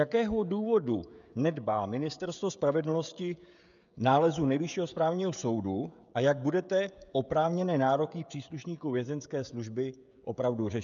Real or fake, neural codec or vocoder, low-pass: fake; codec, 16 kHz, 16 kbps, FreqCodec, smaller model; 7.2 kHz